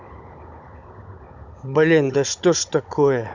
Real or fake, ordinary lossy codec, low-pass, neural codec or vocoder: fake; none; 7.2 kHz; codec, 16 kHz, 16 kbps, FunCodec, trained on Chinese and English, 50 frames a second